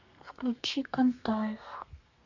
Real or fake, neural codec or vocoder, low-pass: fake; codec, 32 kHz, 1.9 kbps, SNAC; 7.2 kHz